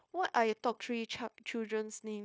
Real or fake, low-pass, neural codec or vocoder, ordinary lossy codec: fake; none; codec, 16 kHz, 0.9 kbps, LongCat-Audio-Codec; none